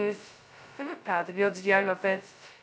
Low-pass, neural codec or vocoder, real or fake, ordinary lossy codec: none; codec, 16 kHz, 0.2 kbps, FocalCodec; fake; none